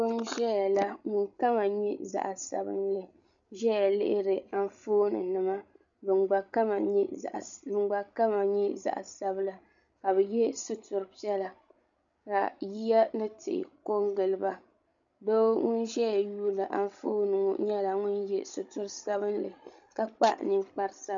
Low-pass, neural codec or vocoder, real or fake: 7.2 kHz; codec, 16 kHz, 16 kbps, FreqCodec, larger model; fake